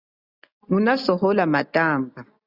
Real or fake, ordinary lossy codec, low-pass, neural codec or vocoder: real; Opus, 64 kbps; 5.4 kHz; none